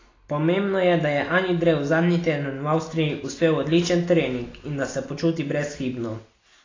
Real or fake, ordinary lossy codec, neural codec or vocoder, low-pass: real; AAC, 32 kbps; none; 7.2 kHz